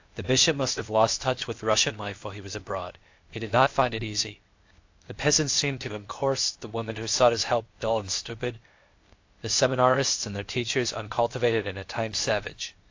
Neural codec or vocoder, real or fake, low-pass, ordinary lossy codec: codec, 16 kHz, 0.8 kbps, ZipCodec; fake; 7.2 kHz; AAC, 48 kbps